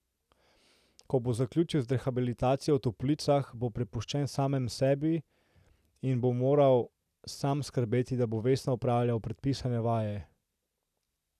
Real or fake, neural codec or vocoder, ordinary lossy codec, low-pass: real; none; none; 14.4 kHz